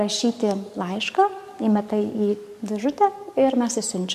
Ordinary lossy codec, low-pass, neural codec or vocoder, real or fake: MP3, 64 kbps; 14.4 kHz; codec, 44.1 kHz, 7.8 kbps, DAC; fake